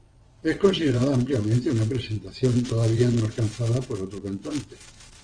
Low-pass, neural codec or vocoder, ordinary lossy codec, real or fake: 9.9 kHz; vocoder, 22.05 kHz, 80 mel bands, WaveNeXt; Opus, 32 kbps; fake